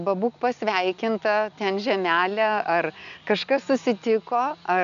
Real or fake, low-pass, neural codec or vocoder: real; 7.2 kHz; none